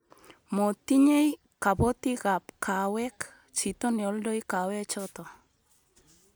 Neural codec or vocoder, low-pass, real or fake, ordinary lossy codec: none; none; real; none